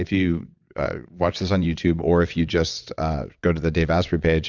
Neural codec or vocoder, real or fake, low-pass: vocoder, 22.05 kHz, 80 mel bands, WaveNeXt; fake; 7.2 kHz